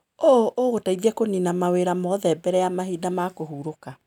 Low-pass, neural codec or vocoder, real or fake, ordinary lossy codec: 19.8 kHz; none; real; none